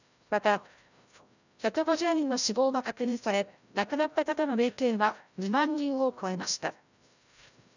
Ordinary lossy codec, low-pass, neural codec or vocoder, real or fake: none; 7.2 kHz; codec, 16 kHz, 0.5 kbps, FreqCodec, larger model; fake